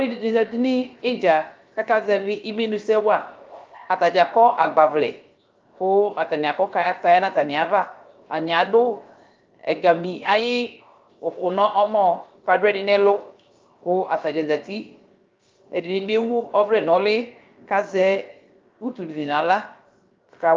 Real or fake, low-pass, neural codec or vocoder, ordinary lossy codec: fake; 7.2 kHz; codec, 16 kHz, 0.7 kbps, FocalCodec; Opus, 24 kbps